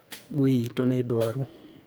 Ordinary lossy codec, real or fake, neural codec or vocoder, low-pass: none; fake; codec, 44.1 kHz, 2.6 kbps, SNAC; none